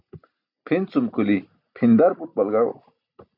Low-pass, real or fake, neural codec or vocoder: 5.4 kHz; real; none